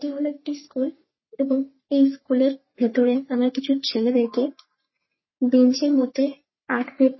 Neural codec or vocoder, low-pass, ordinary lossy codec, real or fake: codec, 44.1 kHz, 3.4 kbps, Pupu-Codec; 7.2 kHz; MP3, 24 kbps; fake